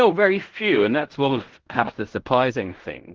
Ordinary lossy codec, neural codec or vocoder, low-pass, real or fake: Opus, 16 kbps; codec, 16 kHz in and 24 kHz out, 0.4 kbps, LongCat-Audio-Codec, fine tuned four codebook decoder; 7.2 kHz; fake